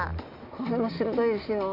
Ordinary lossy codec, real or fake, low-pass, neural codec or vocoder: MP3, 48 kbps; real; 5.4 kHz; none